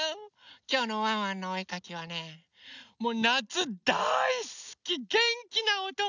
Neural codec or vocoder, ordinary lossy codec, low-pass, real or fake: none; none; 7.2 kHz; real